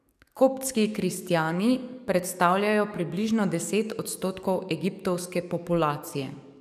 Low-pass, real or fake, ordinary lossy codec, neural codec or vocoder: 14.4 kHz; fake; none; codec, 44.1 kHz, 7.8 kbps, DAC